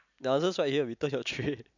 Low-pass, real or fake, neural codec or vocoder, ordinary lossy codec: 7.2 kHz; real; none; none